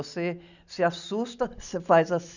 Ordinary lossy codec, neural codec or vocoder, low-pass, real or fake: none; none; 7.2 kHz; real